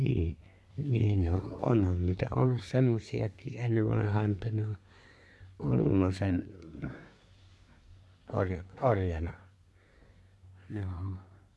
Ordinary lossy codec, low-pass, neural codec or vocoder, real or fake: none; none; codec, 24 kHz, 1 kbps, SNAC; fake